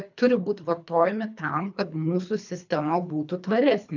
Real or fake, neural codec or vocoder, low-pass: fake; codec, 24 kHz, 3 kbps, HILCodec; 7.2 kHz